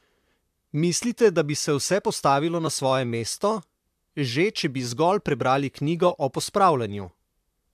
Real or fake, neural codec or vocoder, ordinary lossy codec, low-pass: fake; vocoder, 44.1 kHz, 128 mel bands, Pupu-Vocoder; AAC, 96 kbps; 14.4 kHz